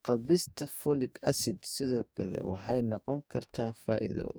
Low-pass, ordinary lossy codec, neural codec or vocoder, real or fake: none; none; codec, 44.1 kHz, 2.6 kbps, DAC; fake